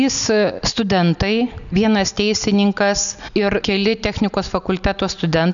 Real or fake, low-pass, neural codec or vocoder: real; 7.2 kHz; none